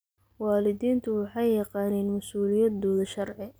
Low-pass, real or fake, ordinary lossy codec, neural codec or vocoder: none; real; none; none